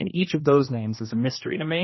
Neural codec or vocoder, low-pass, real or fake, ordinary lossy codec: codec, 16 kHz, 1 kbps, X-Codec, HuBERT features, trained on general audio; 7.2 kHz; fake; MP3, 24 kbps